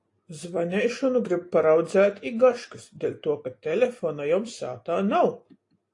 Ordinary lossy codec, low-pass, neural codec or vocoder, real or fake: AAC, 48 kbps; 10.8 kHz; none; real